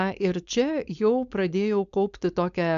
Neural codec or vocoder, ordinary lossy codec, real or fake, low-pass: codec, 16 kHz, 4.8 kbps, FACodec; AAC, 96 kbps; fake; 7.2 kHz